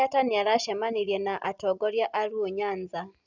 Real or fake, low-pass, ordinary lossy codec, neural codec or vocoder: real; 7.2 kHz; none; none